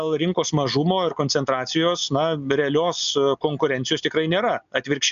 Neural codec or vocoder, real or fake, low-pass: none; real; 7.2 kHz